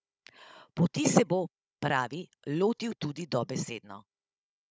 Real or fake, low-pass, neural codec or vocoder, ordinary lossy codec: fake; none; codec, 16 kHz, 16 kbps, FunCodec, trained on Chinese and English, 50 frames a second; none